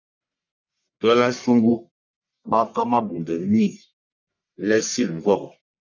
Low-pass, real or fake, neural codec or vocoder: 7.2 kHz; fake; codec, 44.1 kHz, 1.7 kbps, Pupu-Codec